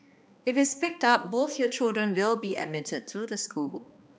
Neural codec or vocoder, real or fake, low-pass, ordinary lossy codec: codec, 16 kHz, 2 kbps, X-Codec, HuBERT features, trained on balanced general audio; fake; none; none